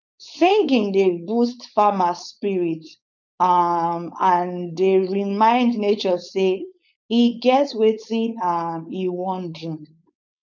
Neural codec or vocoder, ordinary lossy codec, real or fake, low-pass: codec, 16 kHz, 4.8 kbps, FACodec; none; fake; 7.2 kHz